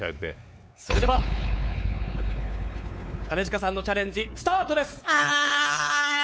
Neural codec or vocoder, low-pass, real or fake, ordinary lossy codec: codec, 16 kHz, 4 kbps, X-Codec, WavLM features, trained on Multilingual LibriSpeech; none; fake; none